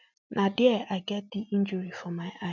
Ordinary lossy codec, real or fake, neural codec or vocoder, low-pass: none; real; none; 7.2 kHz